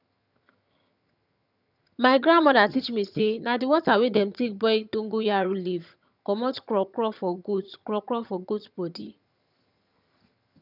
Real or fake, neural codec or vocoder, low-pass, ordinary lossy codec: fake; vocoder, 22.05 kHz, 80 mel bands, HiFi-GAN; 5.4 kHz; none